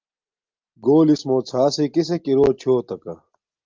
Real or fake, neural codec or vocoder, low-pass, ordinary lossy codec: real; none; 7.2 kHz; Opus, 32 kbps